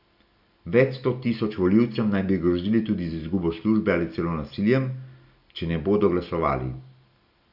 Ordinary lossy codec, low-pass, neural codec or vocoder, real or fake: none; 5.4 kHz; none; real